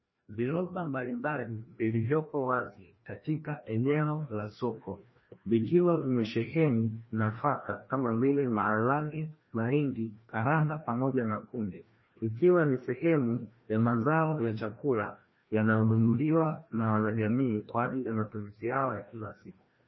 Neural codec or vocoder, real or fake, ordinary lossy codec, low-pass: codec, 16 kHz, 1 kbps, FreqCodec, larger model; fake; MP3, 24 kbps; 7.2 kHz